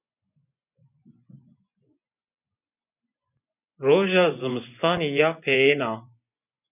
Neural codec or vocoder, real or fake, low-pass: vocoder, 24 kHz, 100 mel bands, Vocos; fake; 3.6 kHz